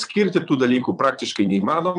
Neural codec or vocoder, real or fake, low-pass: vocoder, 22.05 kHz, 80 mel bands, Vocos; fake; 9.9 kHz